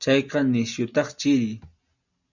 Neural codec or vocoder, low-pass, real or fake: none; 7.2 kHz; real